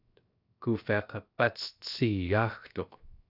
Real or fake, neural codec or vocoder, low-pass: fake; codec, 16 kHz, 0.7 kbps, FocalCodec; 5.4 kHz